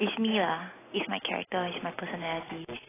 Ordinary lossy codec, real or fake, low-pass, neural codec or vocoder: AAC, 16 kbps; real; 3.6 kHz; none